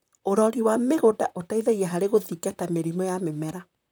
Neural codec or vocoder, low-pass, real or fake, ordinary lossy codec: vocoder, 44.1 kHz, 128 mel bands, Pupu-Vocoder; none; fake; none